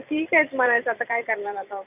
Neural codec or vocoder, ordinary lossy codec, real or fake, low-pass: vocoder, 44.1 kHz, 128 mel bands every 256 samples, BigVGAN v2; none; fake; 3.6 kHz